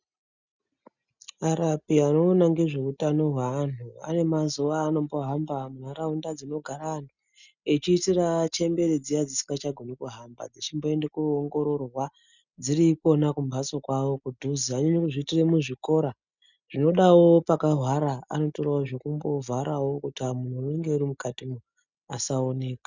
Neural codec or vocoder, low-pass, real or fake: none; 7.2 kHz; real